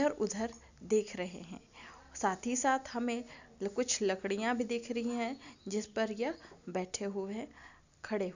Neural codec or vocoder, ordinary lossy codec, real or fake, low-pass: none; none; real; 7.2 kHz